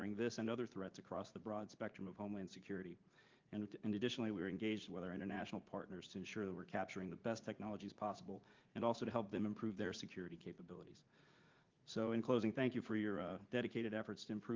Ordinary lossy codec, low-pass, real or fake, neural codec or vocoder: Opus, 32 kbps; 7.2 kHz; fake; vocoder, 44.1 kHz, 80 mel bands, Vocos